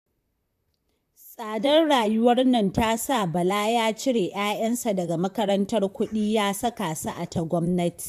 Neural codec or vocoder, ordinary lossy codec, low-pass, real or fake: vocoder, 44.1 kHz, 128 mel bands, Pupu-Vocoder; AAC, 96 kbps; 14.4 kHz; fake